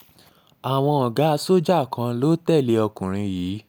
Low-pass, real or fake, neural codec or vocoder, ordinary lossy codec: none; real; none; none